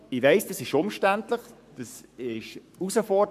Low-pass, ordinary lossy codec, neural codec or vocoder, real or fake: 14.4 kHz; none; codec, 44.1 kHz, 7.8 kbps, Pupu-Codec; fake